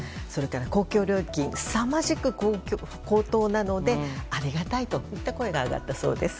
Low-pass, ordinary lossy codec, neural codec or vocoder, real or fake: none; none; none; real